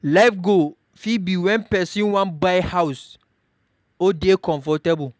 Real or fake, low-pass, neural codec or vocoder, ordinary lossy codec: real; none; none; none